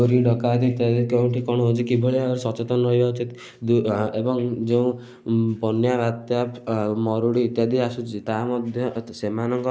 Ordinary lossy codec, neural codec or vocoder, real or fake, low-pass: none; none; real; none